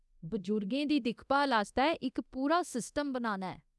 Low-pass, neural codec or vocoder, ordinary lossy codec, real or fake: none; codec, 24 kHz, 0.9 kbps, DualCodec; none; fake